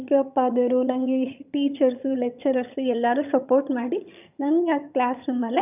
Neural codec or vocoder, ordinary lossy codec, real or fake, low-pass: vocoder, 22.05 kHz, 80 mel bands, HiFi-GAN; none; fake; 3.6 kHz